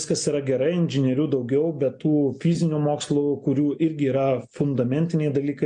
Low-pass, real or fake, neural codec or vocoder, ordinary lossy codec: 9.9 kHz; real; none; MP3, 64 kbps